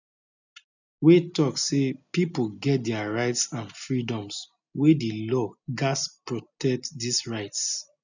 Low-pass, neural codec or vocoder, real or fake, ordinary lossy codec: 7.2 kHz; none; real; none